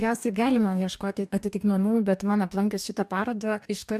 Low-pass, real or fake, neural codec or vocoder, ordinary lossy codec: 14.4 kHz; fake; codec, 44.1 kHz, 2.6 kbps, DAC; AAC, 96 kbps